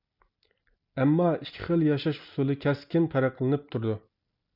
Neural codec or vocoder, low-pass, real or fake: none; 5.4 kHz; real